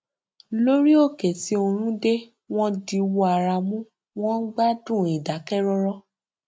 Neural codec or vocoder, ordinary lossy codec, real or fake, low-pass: none; none; real; none